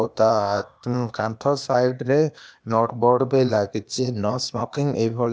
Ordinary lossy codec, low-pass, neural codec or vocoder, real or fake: none; none; codec, 16 kHz, 0.8 kbps, ZipCodec; fake